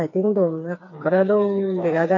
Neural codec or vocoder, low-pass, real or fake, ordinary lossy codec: codec, 16 kHz, 4 kbps, FreqCodec, smaller model; 7.2 kHz; fake; AAC, 48 kbps